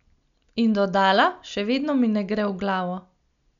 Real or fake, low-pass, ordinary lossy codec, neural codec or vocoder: real; 7.2 kHz; none; none